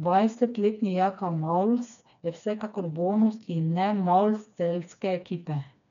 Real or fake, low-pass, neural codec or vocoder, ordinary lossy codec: fake; 7.2 kHz; codec, 16 kHz, 2 kbps, FreqCodec, smaller model; none